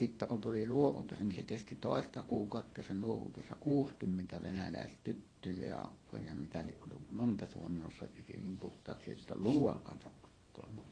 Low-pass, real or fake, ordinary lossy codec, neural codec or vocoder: 9.9 kHz; fake; AAC, 32 kbps; codec, 24 kHz, 0.9 kbps, WavTokenizer, small release